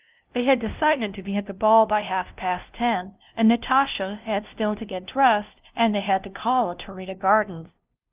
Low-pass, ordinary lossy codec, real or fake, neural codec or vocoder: 3.6 kHz; Opus, 32 kbps; fake; codec, 16 kHz, 0.5 kbps, FunCodec, trained on LibriTTS, 25 frames a second